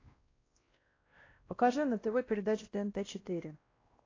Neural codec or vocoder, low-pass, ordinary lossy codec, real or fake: codec, 16 kHz, 1 kbps, X-Codec, WavLM features, trained on Multilingual LibriSpeech; 7.2 kHz; AAC, 32 kbps; fake